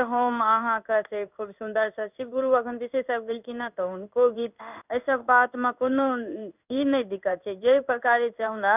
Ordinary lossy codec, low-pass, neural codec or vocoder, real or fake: none; 3.6 kHz; codec, 16 kHz in and 24 kHz out, 1 kbps, XY-Tokenizer; fake